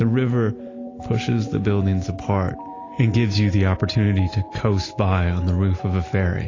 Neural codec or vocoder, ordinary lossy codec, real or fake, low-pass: none; AAC, 32 kbps; real; 7.2 kHz